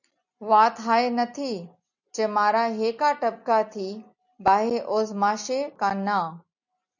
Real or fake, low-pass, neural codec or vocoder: real; 7.2 kHz; none